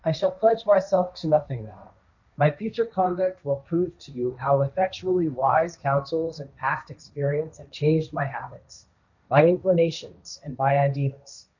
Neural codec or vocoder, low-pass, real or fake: codec, 16 kHz, 1.1 kbps, Voila-Tokenizer; 7.2 kHz; fake